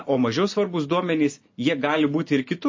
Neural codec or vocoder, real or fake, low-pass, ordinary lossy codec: none; real; 7.2 kHz; MP3, 32 kbps